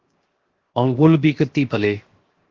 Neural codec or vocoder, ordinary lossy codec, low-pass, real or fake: codec, 16 kHz, 0.7 kbps, FocalCodec; Opus, 16 kbps; 7.2 kHz; fake